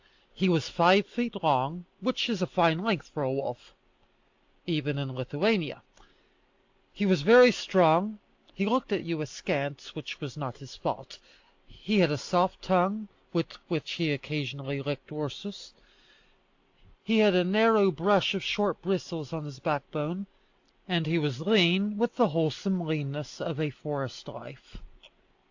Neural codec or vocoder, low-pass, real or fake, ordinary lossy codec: none; 7.2 kHz; real; AAC, 48 kbps